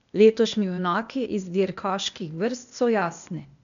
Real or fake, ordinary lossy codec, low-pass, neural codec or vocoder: fake; none; 7.2 kHz; codec, 16 kHz, 0.8 kbps, ZipCodec